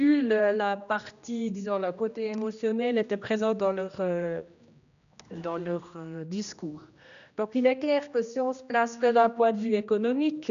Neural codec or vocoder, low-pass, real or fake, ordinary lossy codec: codec, 16 kHz, 1 kbps, X-Codec, HuBERT features, trained on general audio; 7.2 kHz; fake; MP3, 96 kbps